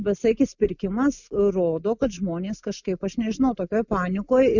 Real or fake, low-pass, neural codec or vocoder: real; 7.2 kHz; none